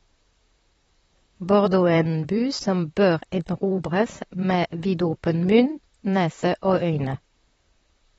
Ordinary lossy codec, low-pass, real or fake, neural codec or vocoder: AAC, 24 kbps; 19.8 kHz; fake; vocoder, 44.1 kHz, 128 mel bands, Pupu-Vocoder